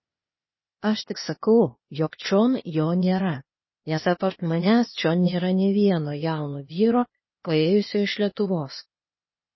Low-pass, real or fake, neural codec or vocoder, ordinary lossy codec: 7.2 kHz; fake; codec, 16 kHz, 0.8 kbps, ZipCodec; MP3, 24 kbps